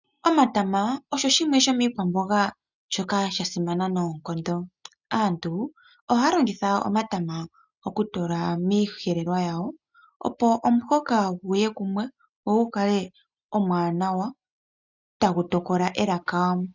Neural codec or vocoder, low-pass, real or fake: none; 7.2 kHz; real